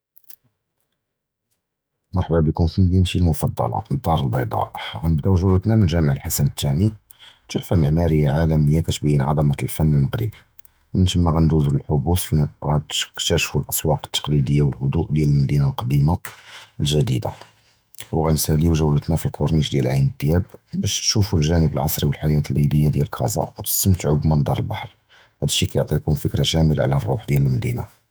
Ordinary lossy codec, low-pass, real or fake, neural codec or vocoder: none; none; fake; autoencoder, 48 kHz, 128 numbers a frame, DAC-VAE, trained on Japanese speech